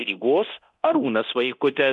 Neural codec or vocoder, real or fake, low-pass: codec, 24 kHz, 0.9 kbps, DualCodec; fake; 10.8 kHz